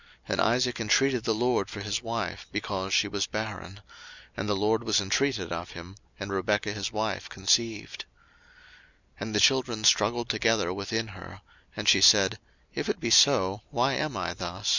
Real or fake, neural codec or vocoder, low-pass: real; none; 7.2 kHz